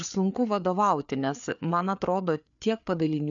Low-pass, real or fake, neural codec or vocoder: 7.2 kHz; fake; codec, 16 kHz, 4 kbps, FreqCodec, larger model